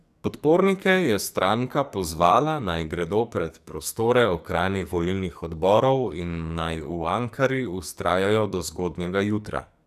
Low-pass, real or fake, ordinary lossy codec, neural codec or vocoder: 14.4 kHz; fake; none; codec, 44.1 kHz, 2.6 kbps, SNAC